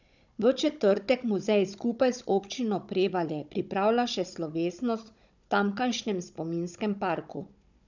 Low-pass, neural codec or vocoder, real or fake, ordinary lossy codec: 7.2 kHz; codec, 16 kHz, 16 kbps, FunCodec, trained on Chinese and English, 50 frames a second; fake; none